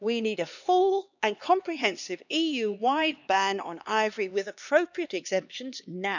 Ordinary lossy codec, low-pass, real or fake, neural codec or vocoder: none; 7.2 kHz; fake; codec, 16 kHz, 2 kbps, X-Codec, HuBERT features, trained on LibriSpeech